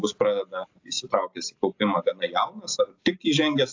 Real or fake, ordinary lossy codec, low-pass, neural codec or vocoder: real; AAC, 48 kbps; 7.2 kHz; none